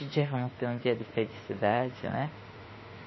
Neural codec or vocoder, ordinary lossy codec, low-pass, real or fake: autoencoder, 48 kHz, 32 numbers a frame, DAC-VAE, trained on Japanese speech; MP3, 24 kbps; 7.2 kHz; fake